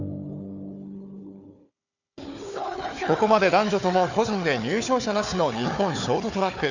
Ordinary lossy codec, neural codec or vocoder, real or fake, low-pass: none; codec, 16 kHz, 4 kbps, FunCodec, trained on Chinese and English, 50 frames a second; fake; 7.2 kHz